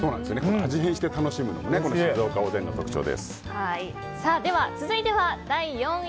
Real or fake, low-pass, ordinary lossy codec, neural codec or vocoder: real; none; none; none